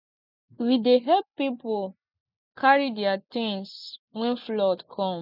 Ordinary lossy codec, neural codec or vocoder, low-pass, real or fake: none; none; 5.4 kHz; real